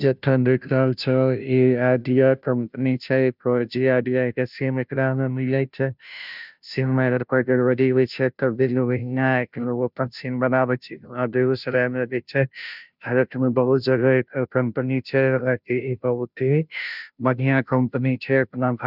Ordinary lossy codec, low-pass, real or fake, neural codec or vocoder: none; 5.4 kHz; fake; codec, 16 kHz, 0.5 kbps, FunCodec, trained on Chinese and English, 25 frames a second